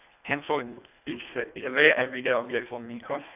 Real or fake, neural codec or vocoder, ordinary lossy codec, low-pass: fake; codec, 24 kHz, 1.5 kbps, HILCodec; none; 3.6 kHz